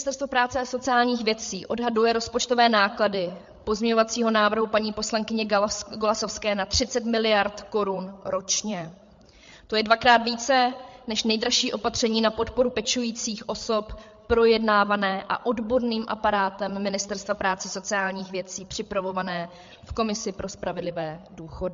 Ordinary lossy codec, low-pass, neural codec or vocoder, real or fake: MP3, 48 kbps; 7.2 kHz; codec, 16 kHz, 8 kbps, FreqCodec, larger model; fake